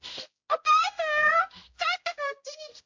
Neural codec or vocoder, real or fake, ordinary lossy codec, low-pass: codec, 16 kHz, 0.5 kbps, X-Codec, HuBERT features, trained on general audio; fake; MP3, 48 kbps; 7.2 kHz